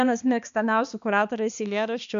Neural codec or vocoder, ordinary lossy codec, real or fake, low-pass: codec, 16 kHz, 2 kbps, X-Codec, HuBERT features, trained on balanced general audio; MP3, 64 kbps; fake; 7.2 kHz